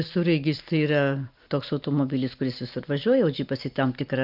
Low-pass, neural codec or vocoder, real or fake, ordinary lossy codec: 5.4 kHz; none; real; Opus, 24 kbps